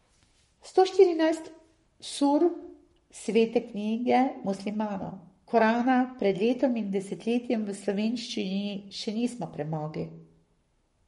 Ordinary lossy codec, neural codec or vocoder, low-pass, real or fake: MP3, 48 kbps; codec, 44.1 kHz, 7.8 kbps, Pupu-Codec; 19.8 kHz; fake